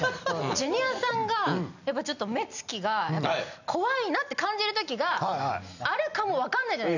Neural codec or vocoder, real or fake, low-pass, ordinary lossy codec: none; real; 7.2 kHz; none